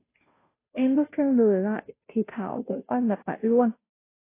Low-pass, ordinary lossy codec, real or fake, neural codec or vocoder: 3.6 kHz; AAC, 24 kbps; fake; codec, 16 kHz, 0.5 kbps, FunCodec, trained on Chinese and English, 25 frames a second